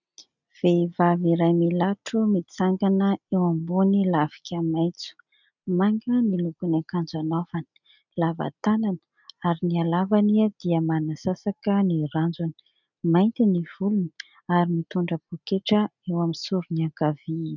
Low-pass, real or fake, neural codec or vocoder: 7.2 kHz; real; none